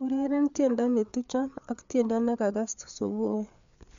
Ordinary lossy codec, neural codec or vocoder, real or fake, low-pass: MP3, 64 kbps; codec, 16 kHz, 4 kbps, FreqCodec, larger model; fake; 7.2 kHz